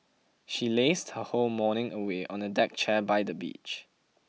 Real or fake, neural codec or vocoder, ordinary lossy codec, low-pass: real; none; none; none